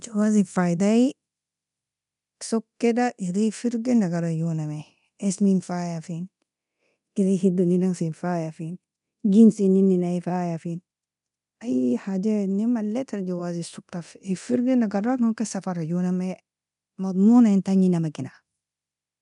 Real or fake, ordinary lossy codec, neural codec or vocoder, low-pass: fake; none; codec, 24 kHz, 0.9 kbps, DualCodec; 10.8 kHz